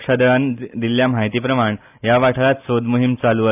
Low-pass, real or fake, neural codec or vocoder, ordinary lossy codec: 3.6 kHz; real; none; AAC, 32 kbps